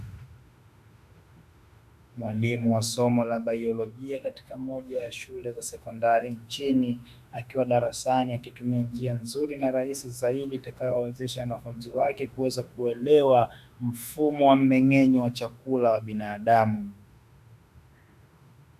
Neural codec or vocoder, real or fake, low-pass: autoencoder, 48 kHz, 32 numbers a frame, DAC-VAE, trained on Japanese speech; fake; 14.4 kHz